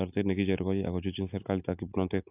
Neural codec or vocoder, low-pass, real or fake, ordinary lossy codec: none; 3.6 kHz; real; AAC, 32 kbps